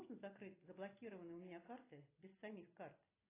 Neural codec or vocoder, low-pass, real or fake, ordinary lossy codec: none; 3.6 kHz; real; AAC, 16 kbps